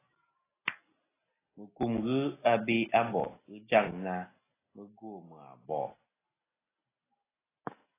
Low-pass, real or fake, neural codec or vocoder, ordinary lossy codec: 3.6 kHz; real; none; AAC, 16 kbps